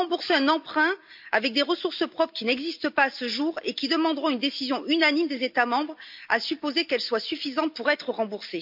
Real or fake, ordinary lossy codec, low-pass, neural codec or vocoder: real; MP3, 48 kbps; 5.4 kHz; none